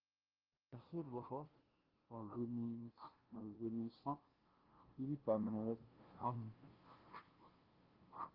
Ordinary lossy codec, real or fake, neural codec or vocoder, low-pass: Opus, 16 kbps; fake; codec, 16 kHz, 0.5 kbps, FunCodec, trained on LibriTTS, 25 frames a second; 5.4 kHz